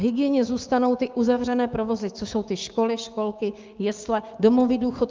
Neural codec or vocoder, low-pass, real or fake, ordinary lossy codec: codec, 16 kHz, 6 kbps, DAC; 7.2 kHz; fake; Opus, 24 kbps